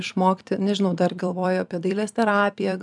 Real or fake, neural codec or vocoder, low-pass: fake; vocoder, 44.1 kHz, 128 mel bands every 256 samples, BigVGAN v2; 10.8 kHz